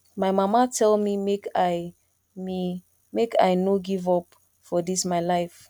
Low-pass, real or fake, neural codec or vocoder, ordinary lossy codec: 19.8 kHz; real; none; none